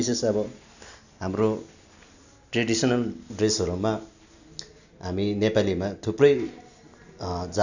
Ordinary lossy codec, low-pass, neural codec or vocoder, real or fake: none; 7.2 kHz; none; real